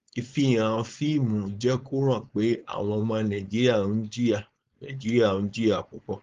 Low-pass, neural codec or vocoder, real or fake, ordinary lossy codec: 7.2 kHz; codec, 16 kHz, 4.8 kbps, FACodec; fake; Opus, 32 kbps